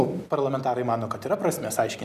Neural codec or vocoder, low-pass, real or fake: none; 14.4 kHz; real